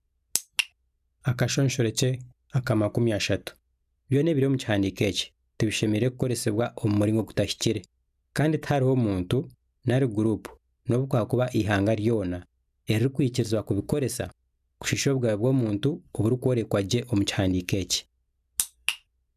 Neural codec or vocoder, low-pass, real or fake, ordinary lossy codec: none; 14.4 kHz; real; none